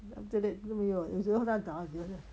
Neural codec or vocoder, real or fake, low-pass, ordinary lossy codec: none; real; none; none